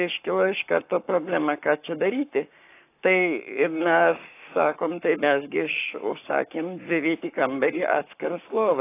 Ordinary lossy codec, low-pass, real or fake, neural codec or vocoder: AAC, 24 kbps; 3.6 kHz; fake; codec, 44.1 kHz, 7.8 kbps, Pupu-Codec